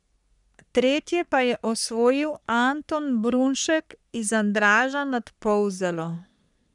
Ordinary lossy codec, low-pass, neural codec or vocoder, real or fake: none; 10.8 kHz; codec, 44.1 kHz, 3.4 kbps, Pupu-Codec; fake